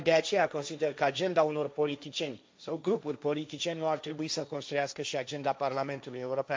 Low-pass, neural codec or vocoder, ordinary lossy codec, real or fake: none; codec, 16 kHz, 1.1 kbps, Voila-Tokenizer; none; fake